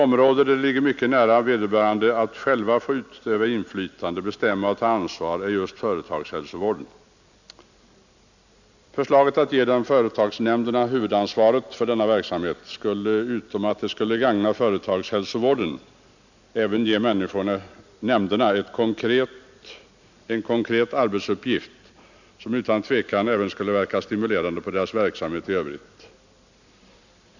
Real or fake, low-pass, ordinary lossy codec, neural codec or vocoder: real; 7.2 kHz; none; none